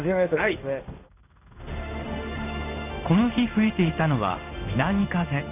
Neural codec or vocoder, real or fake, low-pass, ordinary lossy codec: codec, 16 kHz in and 24 kHz out, 1 kbps, XY-Tokenizer; fake; 3.6 kHz; none